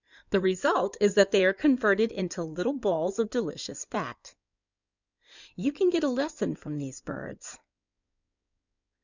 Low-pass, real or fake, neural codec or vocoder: 7.2 kHz; fake; codec, 16 kHz in and 24 kHz out, 2.2 kbps, FireRedTTS-2 codec